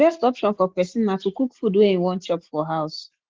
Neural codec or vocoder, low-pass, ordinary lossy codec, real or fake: none; 7.2 kHz; Opus, 16 kbps; real